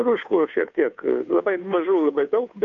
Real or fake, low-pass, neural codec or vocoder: fake; 7.2 kHz; codec, 16 kHz, 2 kbps, FunCodec, trained on Chinese and English, 25 frames a second